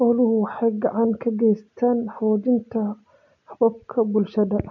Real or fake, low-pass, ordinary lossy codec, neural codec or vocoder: real; 7.2 kHz; none; none